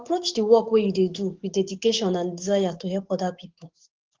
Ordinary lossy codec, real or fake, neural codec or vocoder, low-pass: Opus, 16 kbps; fake; codec, 44.1 kHz, 7.8 kbps, DAC; 7.2 kHz